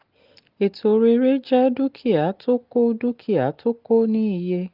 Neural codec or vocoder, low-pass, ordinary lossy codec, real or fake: none; 5.4 kHz; Opus, 32 kbps; real